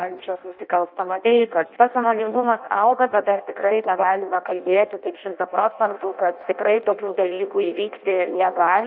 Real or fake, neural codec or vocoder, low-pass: fake; codec, 16 kHz in and 24 kHz out, 0.6 kbps, FireRedTTS-2 codec; 5.4 kHz